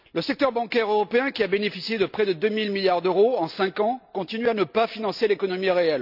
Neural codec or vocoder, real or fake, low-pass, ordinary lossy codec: none; real; 5.4 kHz; none